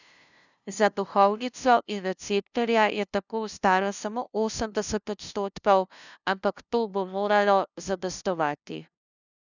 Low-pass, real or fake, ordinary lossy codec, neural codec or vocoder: 7.2 kHz; fake; none; codec, 16 kHz, 0.5 kbps, FunCodec, trained on LibriTTS, 25 frames a second